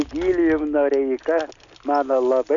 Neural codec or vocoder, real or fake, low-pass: none; real; 7.2 kHz